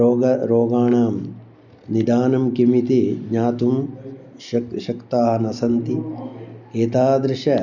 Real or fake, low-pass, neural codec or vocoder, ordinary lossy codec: real; 7.2 kHz; none; none